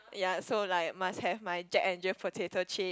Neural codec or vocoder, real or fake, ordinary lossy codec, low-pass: none; real; none; none